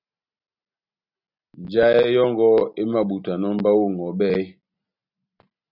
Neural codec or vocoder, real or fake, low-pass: none; real; 5.4 kHz